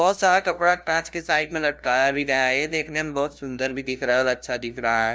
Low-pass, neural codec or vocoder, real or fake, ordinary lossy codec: none; codec, 16 kHz, 0.5 kbps, FunCodec, trained on LibriTTS, 25 frames a second; fake; none